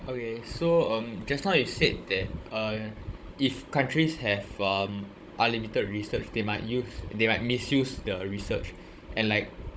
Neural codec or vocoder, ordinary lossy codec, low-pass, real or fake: codec, 16 kHz, 16 kbps, FunCodec, trained on Chinese and English, 50 frames a second; none; none; fake